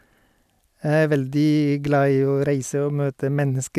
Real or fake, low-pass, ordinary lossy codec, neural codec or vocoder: real; 14.4 kHz; none; none